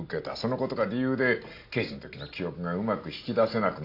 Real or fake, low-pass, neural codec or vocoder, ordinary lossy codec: real; 5.4 kHz; none; AAC, 32 kbps